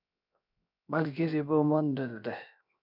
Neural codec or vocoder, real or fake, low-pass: codec, 16 kHz, 0.7 kbps, FocalCodec; fake; 5.4 kHz